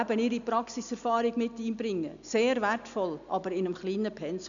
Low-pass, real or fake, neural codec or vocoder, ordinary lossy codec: 7.2 kHz; real; none; none